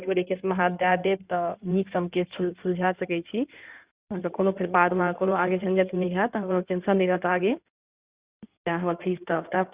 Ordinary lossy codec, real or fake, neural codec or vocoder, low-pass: Opus, 64 kbps; fake; codec, 16 kHz in and 24 kHz out, 2.2 kbps, FireRedTTS-2 codec; 3.6 kHz